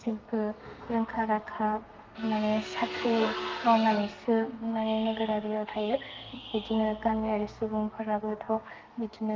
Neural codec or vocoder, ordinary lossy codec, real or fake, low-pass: codec, 32 kHz, 1.9 kbps, SNAC; Opus, 24 kbps; fake; 7.2 kHz